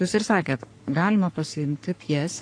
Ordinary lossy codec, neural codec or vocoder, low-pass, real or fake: AAC, 48 kbps; codec, 44.1 kHz, 3.4 kbps, Pupu-Codec; 9.9 kHz; fake